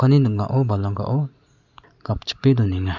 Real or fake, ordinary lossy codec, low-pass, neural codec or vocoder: real; Opus, 64 kbps; 7.2 kHz; none